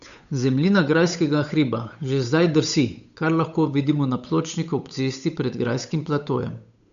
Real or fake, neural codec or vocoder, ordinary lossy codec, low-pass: fake; codec, 16 kHz, 8 kbps, FunCodec, trained on Chinese and English, 25 frames a second; none; 7.2 kHz